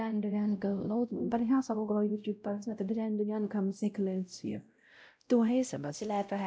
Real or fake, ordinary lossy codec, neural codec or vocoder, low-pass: fake; none; codec, 16 kHz, 0.5 kbps, X-Codec, WavLM features, trained on Multilingual LibriSpeech; none